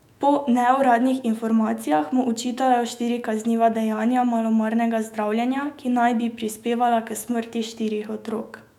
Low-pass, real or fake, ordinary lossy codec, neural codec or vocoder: 19.8 kHz; fake; none; autoencoder, 48 kHz, 128 numbers a frame, DAC-VAE, trained on Japanese speech